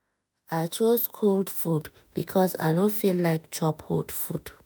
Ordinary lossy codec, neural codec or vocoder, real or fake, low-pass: none; autoencoder, 48 kHz, 32 numbers a frame, DAC-VAE, trained on Japanese speech; fake; none